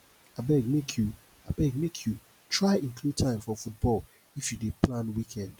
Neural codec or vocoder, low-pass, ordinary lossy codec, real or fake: none; none; none; real